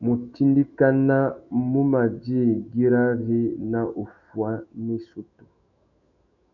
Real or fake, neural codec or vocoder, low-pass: fake; autoencoder, 48 kHz, 128 numbers a frame, DAC-VAE, trained on Japanese speech; 7.2 kHz